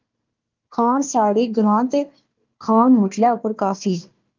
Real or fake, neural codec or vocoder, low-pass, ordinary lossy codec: fake; codec, 16 kHz, 1 kbps, FunCodec, trained on Chinese and English, 50 frames a second; 7.2 kHz; Opus, 32 kbps